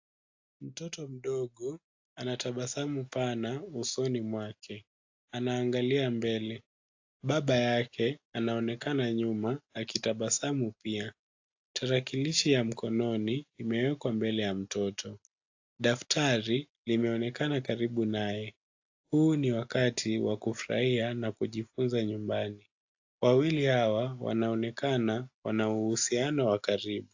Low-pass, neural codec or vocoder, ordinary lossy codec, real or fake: 7.2 kHz; none; AAC, 48 kbps; real